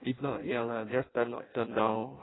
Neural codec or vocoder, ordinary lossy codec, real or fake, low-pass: codec, 16 kHz in and 24 kHz out, 0.6 kbps, FireRedTTS-2 codec; AAC, 16 kbps; fake; 7.2 kHz